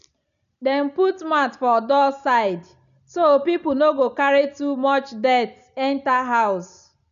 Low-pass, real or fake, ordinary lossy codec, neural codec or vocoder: 7.2 kHz; real; none; none